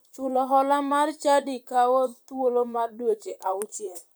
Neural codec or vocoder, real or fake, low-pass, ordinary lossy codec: vocoder, 44.1 kHz, 128 mel bands, Pupu-Vocoder; fake; none; none